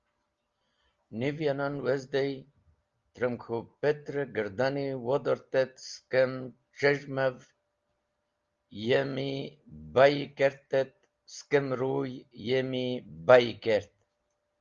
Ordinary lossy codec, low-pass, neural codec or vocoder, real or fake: Opus, 32 kbps; 7.2 kHz; none; real